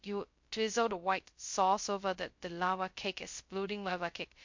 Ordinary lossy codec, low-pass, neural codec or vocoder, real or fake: MP3, 48 kbps; 7.2 kHz; codec, 16 kHz, 0.2 kbps, FocalCodec; fake